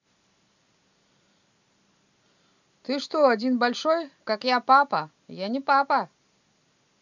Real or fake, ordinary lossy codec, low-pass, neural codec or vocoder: real; none; 7.2 kHz; none